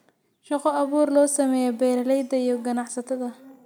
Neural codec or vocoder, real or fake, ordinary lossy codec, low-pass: none; real; none; none